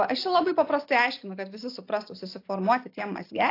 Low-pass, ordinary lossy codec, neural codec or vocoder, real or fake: 5.4 kHz; AAC, 32 kbps; none; real